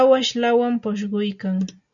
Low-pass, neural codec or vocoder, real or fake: 7.2 kHz; none; real